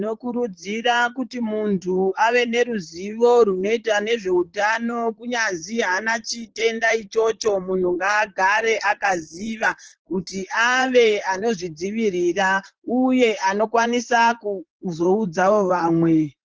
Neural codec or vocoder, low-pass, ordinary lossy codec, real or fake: vocoder, 44.1 kHz, 128 mel bands, Pupu-Vocoder; 7.2 kHz; Opus, 16 kbps; fake